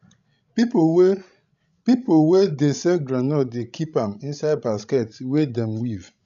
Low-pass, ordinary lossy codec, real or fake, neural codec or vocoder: 7.2 kHz; none; fake; codec, 16 kHz, 16 kbps, FreqCodec, larger model